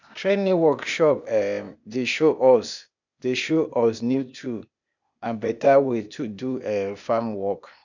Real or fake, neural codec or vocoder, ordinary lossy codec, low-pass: fake; codec, 16 kHz, 0.8 kbps, ZipCodec; none; 7.2 kHz